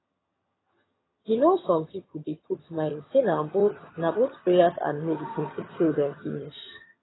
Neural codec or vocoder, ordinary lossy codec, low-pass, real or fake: vocoder, 22.05 kHz, 80 mel bands, HiFi-GAN; AAC, 16 kbps; 7.2 kHz; fake